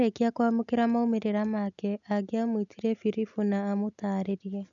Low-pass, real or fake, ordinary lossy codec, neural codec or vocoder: 7.2 kHz; real; none; none